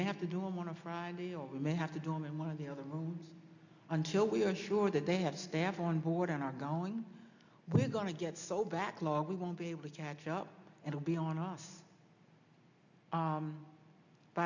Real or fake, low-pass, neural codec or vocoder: real; 7.2 kHz; none